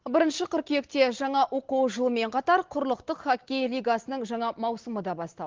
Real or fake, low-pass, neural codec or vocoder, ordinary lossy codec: real; 7.2 kHz; none; Opus, 16 kbps